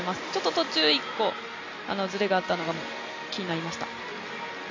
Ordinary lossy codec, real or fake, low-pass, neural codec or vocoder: MP3, 48 kbps; real; 7.2 kHz; none